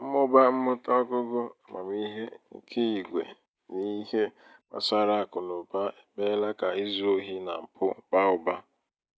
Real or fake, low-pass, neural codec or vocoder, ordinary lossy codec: real; none; none; none